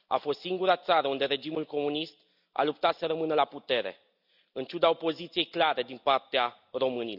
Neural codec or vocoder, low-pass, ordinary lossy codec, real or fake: none; 5.4 kHz; none; real